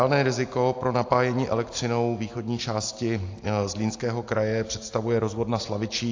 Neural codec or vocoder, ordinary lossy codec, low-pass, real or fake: none; AAC, 48 kbps; 7.2 kHz; real